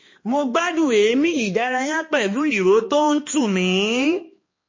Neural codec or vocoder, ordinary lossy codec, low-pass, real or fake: codec, 16 kHz, 2 kbps, X-Codec, HuBERT features, trained on balanced general audio; MP3, 32 kbps; 7.2 kHz; fake